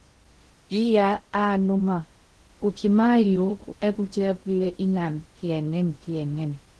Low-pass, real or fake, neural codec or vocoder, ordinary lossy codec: 10.8 kHz; fake; codec, 16 kHz in and 24 kHz out, 0.6 kbps, FocalCodec, streaming, 2048 codes; Opus, 16 kbps